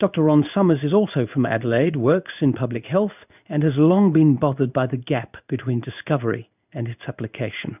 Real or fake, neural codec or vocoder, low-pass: fake; codec, 16 kHz in and 24 kHz out, 1 kbps, XY-Tokenizer; 3.6 kHz